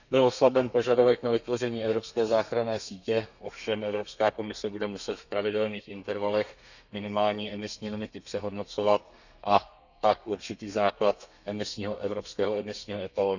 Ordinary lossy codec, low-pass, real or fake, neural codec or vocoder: none; 7.2 kHz; fake; codec, 32 kHz, 1.9 kbps, SNAC